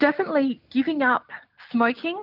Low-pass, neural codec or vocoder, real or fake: 5.4 kHz; none; real